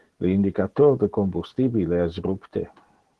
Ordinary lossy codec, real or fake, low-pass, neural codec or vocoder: Opus, 16 kbps; real; 10.8 kHz; none